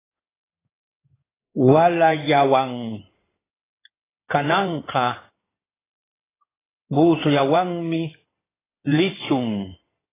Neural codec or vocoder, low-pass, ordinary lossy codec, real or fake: codec, 16 kHz in and 24 kHz out, 2.2 kbps, FireRedTTS-2 codec; 3.6 kHz; AAC, 16 kbps; fake